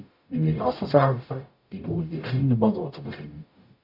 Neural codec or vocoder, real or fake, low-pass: codec, 44.1 kHz, 0.9 kbps, DAC; fake; 5.4 kHz